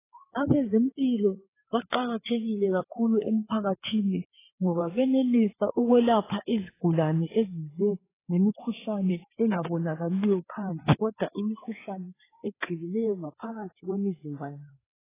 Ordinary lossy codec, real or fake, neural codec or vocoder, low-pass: AAC, 16 kbps; fake; codec, 16 kHz, 4 kbps, FreqCodec, larger model; 3.6 kHz